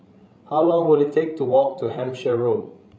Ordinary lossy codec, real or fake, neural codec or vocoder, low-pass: none; fake; codec, 16 kHz, 16 kbps, FreqCodec, larger model; none